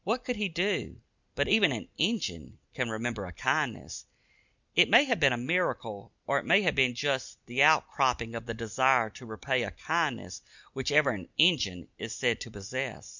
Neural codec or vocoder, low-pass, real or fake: none; 7.2 kHz; real